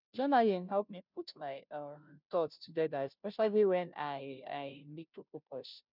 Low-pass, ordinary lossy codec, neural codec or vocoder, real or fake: 5.4 kHz; none; codec, 16 kHz, 0.5 kbps, FunCodec, trained on Chinese and English, 25 frames a second; fake